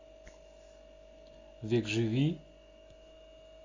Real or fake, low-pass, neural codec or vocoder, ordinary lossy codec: real; 7.2 kHz; none; AAC, 32 kbps